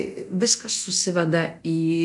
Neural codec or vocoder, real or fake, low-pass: codec, 24 kHz, 0.9 kbps, DualCodec; fake; 10.8 kHz